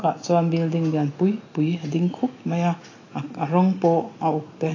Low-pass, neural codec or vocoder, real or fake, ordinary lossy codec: 7.2 kHz; none; real; AAC, 32 kbps